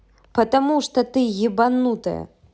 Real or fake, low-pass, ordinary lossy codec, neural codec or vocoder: real; none; none; none